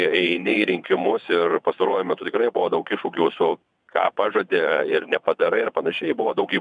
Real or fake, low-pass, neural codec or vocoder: fake; 9.9 kHz; vocoder, 22.05 kHz, 80 mel bands, WaveNeXt